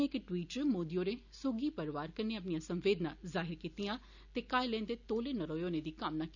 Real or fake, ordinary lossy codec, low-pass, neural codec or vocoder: real; none; 7.2 kHz; none